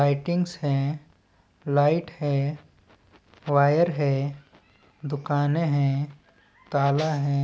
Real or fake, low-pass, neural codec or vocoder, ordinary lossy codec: real; none; none; none